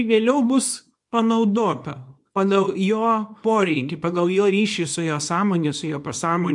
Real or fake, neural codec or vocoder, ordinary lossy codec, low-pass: fake; codec, 24 kHz, 0.9 kbps, WavTokenizer, small release; MP3, 64 kbps; 10.8 kHz